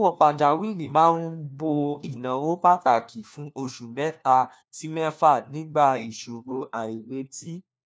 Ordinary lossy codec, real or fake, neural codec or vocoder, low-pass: none; fake; codec, 16 kHz, 1 kbps, FunCodec, trained on LibriTTS, 50 frames a second; none